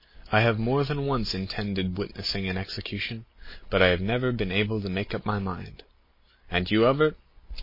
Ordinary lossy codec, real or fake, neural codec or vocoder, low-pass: MP3, 24 kbps; real; none; 5.4 kHz